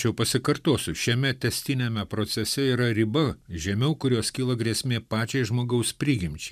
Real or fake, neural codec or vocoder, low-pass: fake; vocoder, 44.1 kHz, 128 mel bands every 512 samples, BigVGAN v2; 14.4 kHz